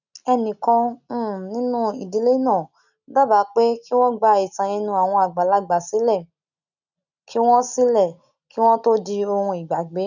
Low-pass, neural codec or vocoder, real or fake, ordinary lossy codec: 7.2 kHz; none; real; none